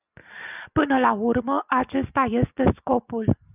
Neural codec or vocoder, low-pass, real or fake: none; 3.6 kHz; real